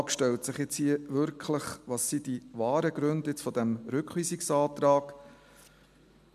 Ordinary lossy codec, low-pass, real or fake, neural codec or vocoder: MP3, 96 kbps; 14.4 kHz; real; none